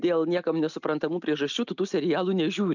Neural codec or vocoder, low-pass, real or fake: none; 7.2 kHz; real